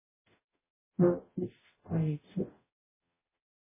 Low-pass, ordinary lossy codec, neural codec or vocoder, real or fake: 3.6 kHz; MP3, 16 kbps; codec, 44.1 kHz, 0.9 kbps, DAC; fake